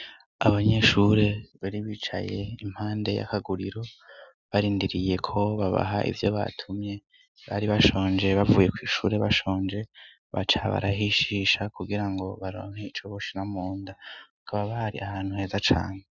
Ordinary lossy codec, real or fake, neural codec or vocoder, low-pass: Opus, 64 kbps; real; none; 7.2 kHz